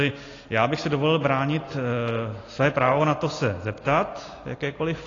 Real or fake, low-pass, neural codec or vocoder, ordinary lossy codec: real; 7.2 kHz; none; AAC, 32 kbps